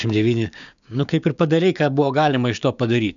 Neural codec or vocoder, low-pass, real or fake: codec, 16 kHz, 6 kbps, DAC; 7.2 kHz; fake